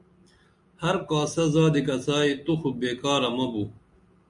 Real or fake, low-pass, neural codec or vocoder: real; 10.8 kHz; none